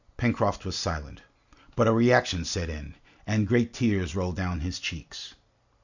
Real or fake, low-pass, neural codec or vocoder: real; 7.2 kHz; none